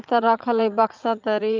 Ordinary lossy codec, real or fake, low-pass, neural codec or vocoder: Opus, 24 kbps; fake; 7.2 kHz; vocoder, 44.1 kHz, 80 mel bands, Vocos